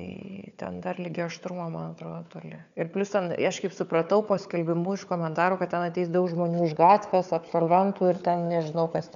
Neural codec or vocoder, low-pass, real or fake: codec, 16 kHz, 4 kbps, FunCodec, trained on Chinese and English, 50 frames a second; 7.2 kHz; fake